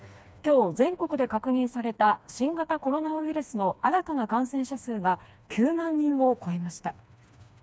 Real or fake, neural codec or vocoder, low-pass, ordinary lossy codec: fake; codec, 16 kHz, 2 kbps, FreqCodec, smaller model; none; none